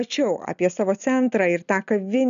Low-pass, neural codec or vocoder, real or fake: 7.2 kHz; none; real